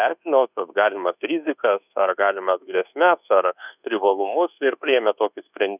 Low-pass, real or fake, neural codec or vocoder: 3.6 kHz; fake; codec, 24 kHz, 1.2 kbps, DualCodec